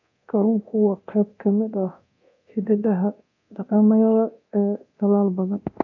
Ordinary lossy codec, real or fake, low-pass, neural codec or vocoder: AAC, 48 kbps; fake; 7.2 kHz; codec, 24 kHz, 0.9 kbps, DualCodec